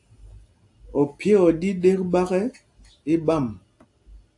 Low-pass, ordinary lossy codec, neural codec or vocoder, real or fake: 10.8 kHz; AAC, 64 kbps; none; real